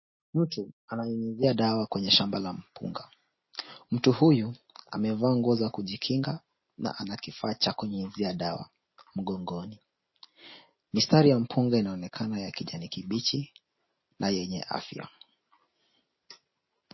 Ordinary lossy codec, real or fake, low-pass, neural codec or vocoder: MP3, 24 kbps; real; 7.2 kHz; none